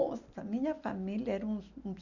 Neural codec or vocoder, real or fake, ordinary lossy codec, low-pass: none; real; none; 7.2 kHz